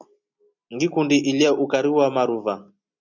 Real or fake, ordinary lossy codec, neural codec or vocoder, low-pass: real; MP3, 64 kbps; none; 7.2 kHz